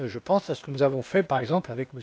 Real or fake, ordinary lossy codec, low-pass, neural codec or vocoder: fake; none; none; codec, 16 kHz, 0.8 kbps, ZipCodec